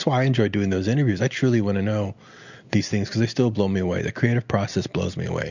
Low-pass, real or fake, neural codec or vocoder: 7.2 kHz; real; none